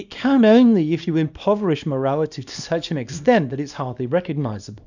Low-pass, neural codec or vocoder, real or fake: 7.2 kHz; codec, 24 kHz, 0.9 kbps, WavTokenizer, small release; fake